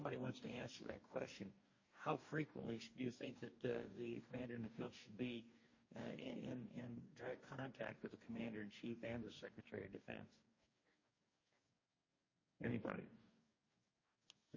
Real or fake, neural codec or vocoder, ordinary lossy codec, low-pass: fake; codec, 44.1 kHz, 2.6 kbps, DAC; MP3, 32 kbps; 7.2 kHz